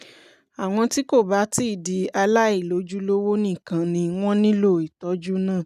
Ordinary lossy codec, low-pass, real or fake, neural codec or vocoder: none; 14.4 kHz; real; none